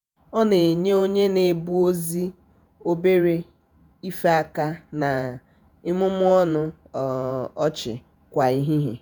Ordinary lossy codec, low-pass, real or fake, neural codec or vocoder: none; none; fake; vocoder, 48 kHz, 128 mel bands, Vocos